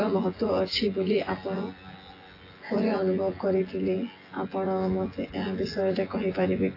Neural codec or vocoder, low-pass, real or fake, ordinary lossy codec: vocoder, 24 kHz, 100 mel bands, Vocos; 5.4 kHz; fake; AAC, 24 kbps